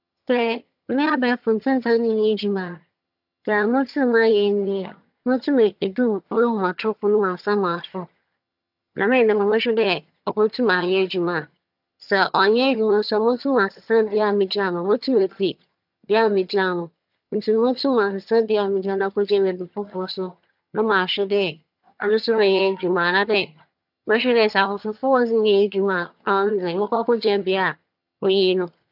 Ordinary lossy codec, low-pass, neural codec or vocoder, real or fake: AAC, 48 kbps; 5.4 kHz; vocoder, 22.05 kHz, 80 mel bands, HiFi-GAN; fake